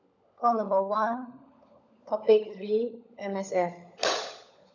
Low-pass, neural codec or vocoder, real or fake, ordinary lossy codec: 7.2 kHz; codec, 16 kHz, 16 kbps, FunCodec, trained on LibriTTS, 50 frames a second; fake; none